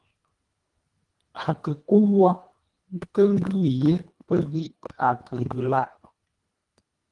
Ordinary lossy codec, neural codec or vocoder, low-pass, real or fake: Opus, 24 kbps; codec, 24 kHz, 1.5 kbps, HILCodec; 10.8 kHz; fake